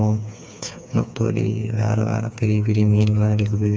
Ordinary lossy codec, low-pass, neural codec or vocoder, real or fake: none; none; codec, 16 kHz, 4 kbps, FreqCodec, smaller model; fake